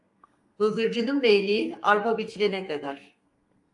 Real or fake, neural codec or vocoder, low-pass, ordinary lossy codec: fake; codec, 32 kHz, 1.9 kbps, SNAC; 10.8 kHz; AAC, 64 kbps